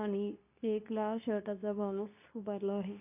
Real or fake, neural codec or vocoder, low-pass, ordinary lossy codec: fake; codec, 24 kHz, 0.9 kbps, WavTokenizer, medium speech release version 2; 3.6 kHz; none